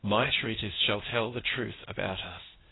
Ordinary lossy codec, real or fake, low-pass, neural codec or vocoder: AAC, 16 kbps; fake; 7.2 kHz; codec, 16 kHz, 0.8 kbps, ZipCodec